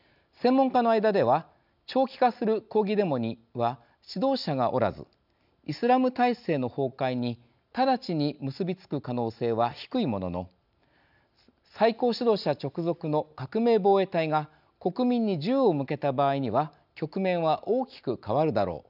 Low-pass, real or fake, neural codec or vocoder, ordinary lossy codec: 5.4 kHz; real; none; none